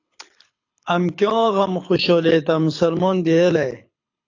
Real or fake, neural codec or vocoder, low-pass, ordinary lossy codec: fake; codec, 24 kHz, 6 kbps, HILCodec; 7.2 kHz; AAC, 48 kbps